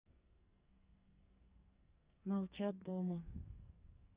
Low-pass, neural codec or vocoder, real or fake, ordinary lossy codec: 3.6 kHz; codec, 44.1 kHz, 2.6 kbps, SNAC; fake; Opus, 64 kbps